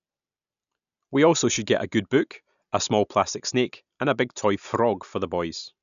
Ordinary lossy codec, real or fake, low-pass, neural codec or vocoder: none; real; 7.2 kHz; none